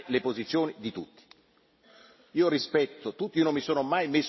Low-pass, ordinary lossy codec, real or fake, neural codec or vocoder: 7.2 kHz; MP3, 24 kbps; real; none